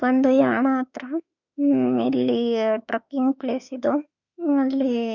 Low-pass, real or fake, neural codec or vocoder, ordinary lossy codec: 7.2 kHz; fake; autoencoder, 48 kHz, 32 numbers a frame, DAC-VAE, trained on Japanese speech; none